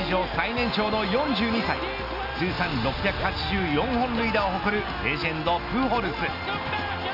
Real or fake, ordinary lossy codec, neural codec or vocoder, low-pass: real; none; none; 5.4 kHz